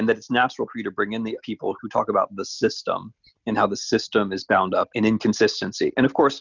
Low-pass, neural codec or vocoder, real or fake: 7.2 kHz; none; real